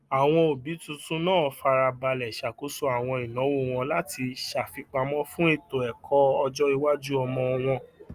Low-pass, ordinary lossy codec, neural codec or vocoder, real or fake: 14.4 kHz; Opus, 32 kbps; none; real